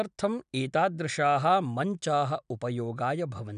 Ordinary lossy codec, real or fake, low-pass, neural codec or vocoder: none; real; 9.9 kHz; none